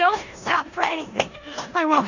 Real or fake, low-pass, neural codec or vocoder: fake; 7.2 kHz; codec, 16 kHz in and 24 kHz out, 0.9 kbps, LongCat-Audio-Codec, four codebook decoder